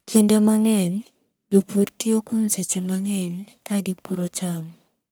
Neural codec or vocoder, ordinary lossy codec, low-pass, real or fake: codec, 44.1 kHz, 1.7 kbps, Pupu-Codec; none; none; fake